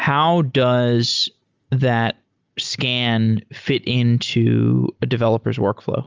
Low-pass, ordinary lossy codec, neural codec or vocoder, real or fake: 7.2 kHz; Opus, 32 kbps; none; real